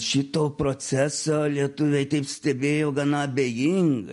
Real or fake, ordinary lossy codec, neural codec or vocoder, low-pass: real; MP3, 48 kbps; none; 10.8 kHz